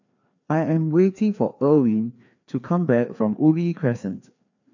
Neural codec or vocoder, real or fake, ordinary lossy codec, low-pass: codec, 16 kHz, 2 kbps, FreqCodec, larger model; fake; none; 7.2 kHz